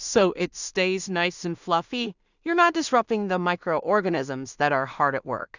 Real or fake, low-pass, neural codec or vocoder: fake; 7.2 kHz; codec, 16 kHz in and 24 kHz out, 0.4 kbps, LongCat-Audio-Codec, two codebook decoder